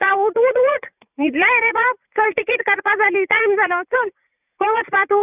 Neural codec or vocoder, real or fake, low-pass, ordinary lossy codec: vocoder, 44.1 kHz, 80 mel bands, Vocos; fake; 3.6 kHz; none